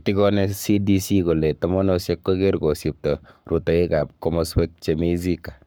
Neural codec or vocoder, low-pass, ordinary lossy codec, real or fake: codec, 44.1 kHz, 7.8 kbps, Pupu-Codec; none; none; fake